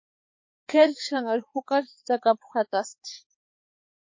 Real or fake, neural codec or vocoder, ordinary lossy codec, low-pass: fake; codec, 16 kHz, 4 kbps, X-Codec, HuBERT features, trained on balanced general audio; MP3, 32 kbps; 7.2 kHz